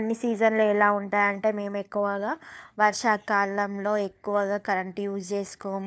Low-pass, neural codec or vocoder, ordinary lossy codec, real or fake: none; codec, 16 kHz, 4 kbps, FunCodec, trained on LibriTTS, 50 frames a second; none; fake